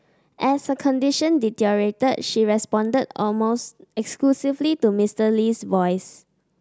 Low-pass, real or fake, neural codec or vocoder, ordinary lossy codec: none; real; none; none